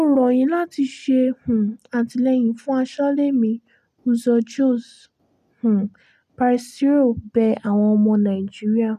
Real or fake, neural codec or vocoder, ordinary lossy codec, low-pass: real; none; none; 14.4 kHz